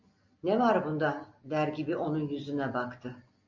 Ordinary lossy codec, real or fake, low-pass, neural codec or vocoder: MP3, 48 kbps; real; 7.2 kHz; none